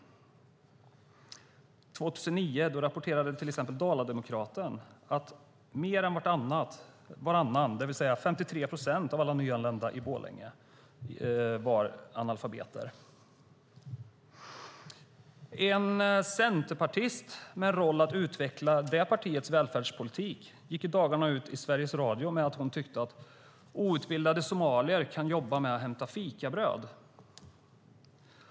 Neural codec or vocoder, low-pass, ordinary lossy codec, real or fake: none; none; none; real